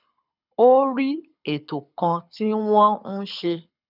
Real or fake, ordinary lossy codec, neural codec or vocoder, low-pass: fake; none; codec, 24 kHz, 6 kbps, HILCodec; 5.4 kHz